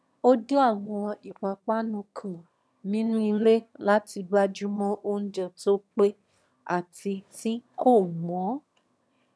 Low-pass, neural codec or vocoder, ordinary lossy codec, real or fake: none; autoencoder, 22.05 kHz, a latent of 192 numbers a frame, VITS, trained on one speaker; none; fake